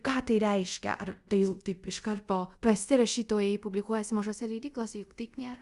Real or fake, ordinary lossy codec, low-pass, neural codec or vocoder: fake; Opus, 64 kbps; 10.8 kHz; codec, 24 kHz, 0.5 kbps, DualCodec